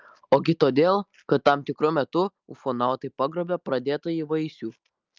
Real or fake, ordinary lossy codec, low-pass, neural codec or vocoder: real; Opus, 24 kbps; 7.2 kHz; none